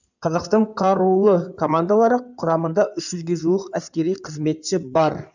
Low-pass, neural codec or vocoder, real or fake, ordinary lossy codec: 7.2 kHz; codec, 16 kHz in and 24 kHz out, 2.2 kbps, FireRedTTS-2 codec; fake; none